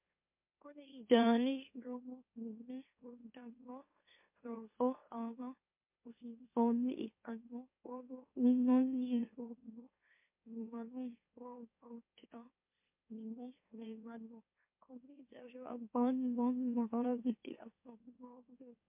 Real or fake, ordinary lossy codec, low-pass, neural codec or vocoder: fake; MP3, 32 kbps; 3.6 kHz; autoencoder, 44.1 kHz, a latent of 192 numbers a frame, MeloTTS